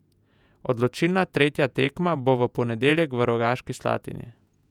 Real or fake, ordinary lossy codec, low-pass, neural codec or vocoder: fake; none; 19.8 kHz; vocoder, 48 kHz, 128 mel bands, Vocos